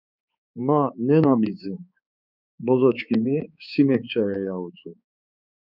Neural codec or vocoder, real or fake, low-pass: codec, 16 kHz, 4 kbps, X-Codec, HuBERT features, trained on balanced general audio; fake; 5.4 kHz